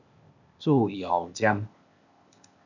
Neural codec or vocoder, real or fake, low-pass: codec, 16 kHz, 0.8 kbps, ZipCodec; fake; 7.2 kHz